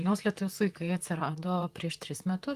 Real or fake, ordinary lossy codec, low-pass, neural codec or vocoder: fake; Opus, 24 kbps; 14.4 kHz; vocoder, 44.1 kHz, 128 mel bands, Pupu-Vocoder